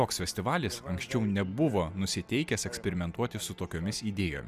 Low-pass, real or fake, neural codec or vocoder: 14.4 kHz; real; none